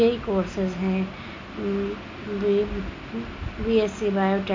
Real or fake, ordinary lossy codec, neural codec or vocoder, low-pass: real; MP3, 64 kbps; none; 7.2 kHz